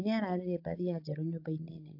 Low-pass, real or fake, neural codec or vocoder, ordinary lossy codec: 5.4 kHz; fake; codec, 16 kHz, 16 kbps, FreqCodec, larger model; AAC, 32 kbps